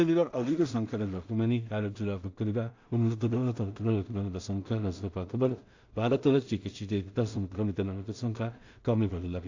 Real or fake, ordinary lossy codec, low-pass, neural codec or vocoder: fake; AAC, 48 kbps; 7.2 kHz; codec, 16 kHz in and 24 kHz out, 0.4 kbps, LongCat-Audio-Codec, two codebook decoder